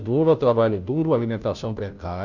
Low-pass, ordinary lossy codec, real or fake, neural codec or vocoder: 7.2 kHz; none; fake; codec, 16 kHz, 0.5 kbps, FunCodec, trained on Chinese and English, 25 frames a second